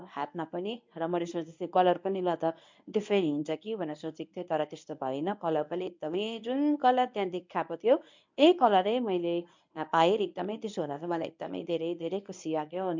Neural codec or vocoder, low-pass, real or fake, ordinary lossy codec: codec, 24 kHz, 0.9 kbps, WavTokenizer, medium speech release version 1; 7.2 kHz; fake; MP3, 64 kbps